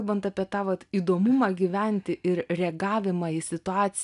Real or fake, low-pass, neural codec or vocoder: real; 10.8 kHz; none